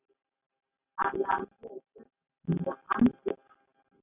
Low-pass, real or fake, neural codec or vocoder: 3.6 kHz; real; none